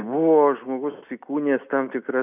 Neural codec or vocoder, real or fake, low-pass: none; real; 3.6 kHz